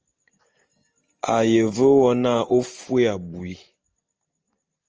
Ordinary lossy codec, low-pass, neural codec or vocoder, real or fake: Opus, 24 kbps; 7.2 kHz; none; real